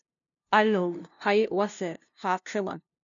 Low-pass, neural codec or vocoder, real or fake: 7.2 kHz; codec, 16 kHz, 0.5 kbps, FunCodec, trained on LibriTTS, 25 frames a second; fake